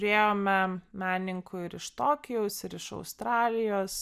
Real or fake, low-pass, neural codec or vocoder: real; 14.4 kHz; none